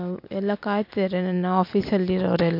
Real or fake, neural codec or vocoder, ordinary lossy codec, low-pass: real; none; MP3, 48 kbps; 5.4 kHz